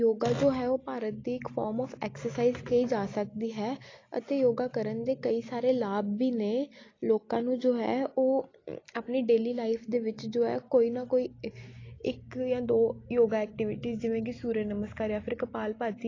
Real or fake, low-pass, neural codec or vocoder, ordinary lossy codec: real; 7.2 kHz; none; AAC, 32 kbps